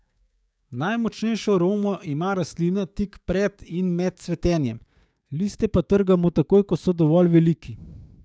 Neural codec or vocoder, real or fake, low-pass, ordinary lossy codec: codec, 16 kHz, 6 kbps, DAC; fake; none; none